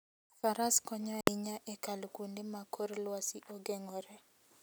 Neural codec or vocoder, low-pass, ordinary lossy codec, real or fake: none; none; none; real